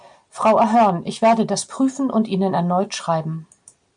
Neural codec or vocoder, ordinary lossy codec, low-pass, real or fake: none; MP3, 64 kbps; 9.9 kHz; real